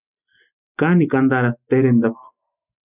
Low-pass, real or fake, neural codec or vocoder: 3.6 kHz; real; none